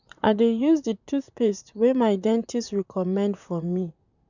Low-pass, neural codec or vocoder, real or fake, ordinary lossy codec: 7.2 kHz; vocoder, 44.1 kHz, 128 mel bands, Pupu-Vocoder; fake; none